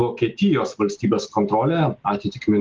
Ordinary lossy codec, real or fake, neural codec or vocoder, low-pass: Opus, 24 kbps; real; none; 7.2 kHz